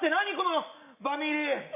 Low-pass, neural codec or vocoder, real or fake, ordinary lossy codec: 3.6 kHz; none; real; none